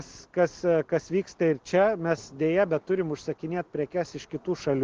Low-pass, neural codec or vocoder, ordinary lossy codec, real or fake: 7.2 kHz; none; Opus, 16 kbps; real